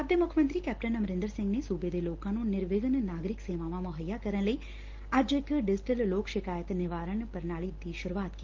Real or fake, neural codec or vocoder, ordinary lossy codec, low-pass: real; none; Opus, 16 kbps; 7.2 kHz